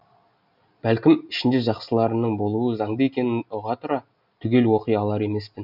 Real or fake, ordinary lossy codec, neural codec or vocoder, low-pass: real; none; none; 5.4 kHz